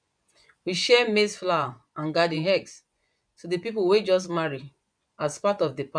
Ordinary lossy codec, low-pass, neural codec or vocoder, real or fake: none; 9.9 kHz; vocoder, 24 kHz, 100 mel bands, Vocos; fake